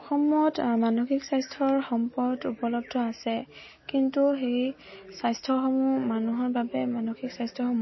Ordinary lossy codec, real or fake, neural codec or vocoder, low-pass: MP3, 24 kbps; real; none; 7.2 kHz